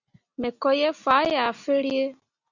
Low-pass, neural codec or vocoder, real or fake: 7.2 kHz; none; real